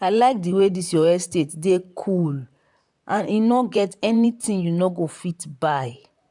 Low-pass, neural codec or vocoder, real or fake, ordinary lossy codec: 10.8 kHz; vocoder, 44.1 kHz, 128 mel bands, Pupu-Vocoder; fake; MP3, 96 kbps